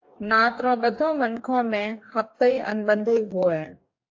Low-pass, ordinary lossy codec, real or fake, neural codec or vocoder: 7.2 kHz; AAC, 48 kbps; fake; codec, 44.1 kHz, 2.6 kbps, DAC